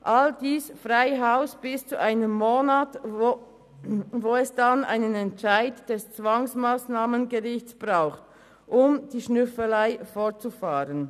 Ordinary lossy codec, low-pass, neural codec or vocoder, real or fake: none; 14.4 kHz; none; real